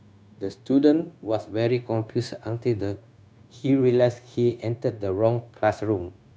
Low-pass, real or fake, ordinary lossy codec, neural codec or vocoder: none; fake; none; codec, 16 kHz, 0.9 kbps, LongCat-Audio-Codec